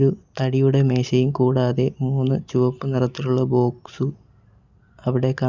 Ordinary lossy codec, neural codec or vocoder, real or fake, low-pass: Opus, 64 kbps; none; real; 7.2 kHz